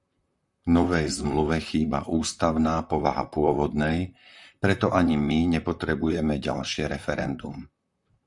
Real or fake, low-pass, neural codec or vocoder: fake; 10.8 kHz; vocoder, 44.1 kHz, 128 mel bands, Pupu-Vocoder